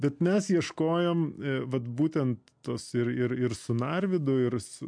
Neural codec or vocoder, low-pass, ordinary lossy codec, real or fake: none; 9.9 kHz; MP3, 64 kbps; real